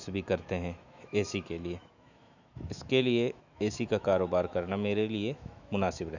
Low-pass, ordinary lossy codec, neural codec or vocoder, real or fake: 7.2 kHz; none; autoencoder, 48 kHz, 128 numbers a frame, DAC-VAE, trained on Japanese speech; fake